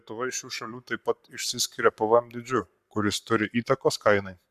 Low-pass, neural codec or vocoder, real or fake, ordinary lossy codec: 14.4 kHz; codec, 44.1 kHz, 7.8 kbps, Pupu-Codec; fake; AAC, 96 kbps